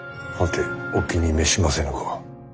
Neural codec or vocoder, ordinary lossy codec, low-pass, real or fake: none; none; none; real